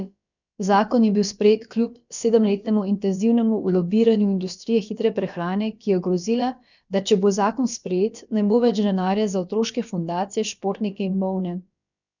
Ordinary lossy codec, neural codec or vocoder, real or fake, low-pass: none; codec, 16 kHz, about 1 kbps, DyCAST, with the encoder's durations; fake; 7.2 kHz